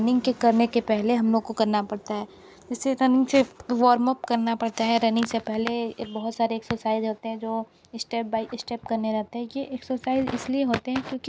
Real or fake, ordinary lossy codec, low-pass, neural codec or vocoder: real; none; none; none